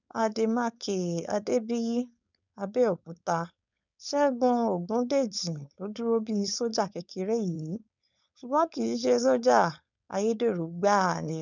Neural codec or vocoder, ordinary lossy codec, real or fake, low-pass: codec, 16 kHz, 4.8 kbps, FACodec; none; fake; 7.2 kHz